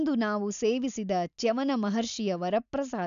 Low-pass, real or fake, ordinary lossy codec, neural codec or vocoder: 7.2 kHz; real; none; none